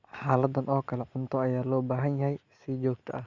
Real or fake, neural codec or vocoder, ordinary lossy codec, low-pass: real; none; Opus, 64 kbps; 7.2 kHz